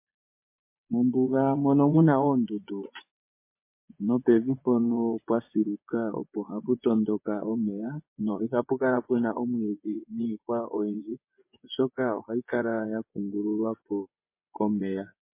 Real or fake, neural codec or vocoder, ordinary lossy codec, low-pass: fake; vocoder, 24 kHz, 100 mel bands, Vocos; MP3, 24 kbps; 3.6 kHz